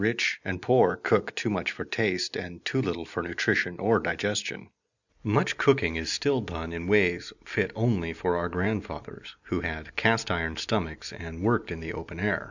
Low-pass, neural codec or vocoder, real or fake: 7.2 kHz; none; real